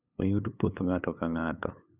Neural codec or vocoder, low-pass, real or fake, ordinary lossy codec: codec, 16 kHz, 8 kbps, FunCodec, trained on LibriTTS, 25 frames a second; 3.6 kHz; fake; none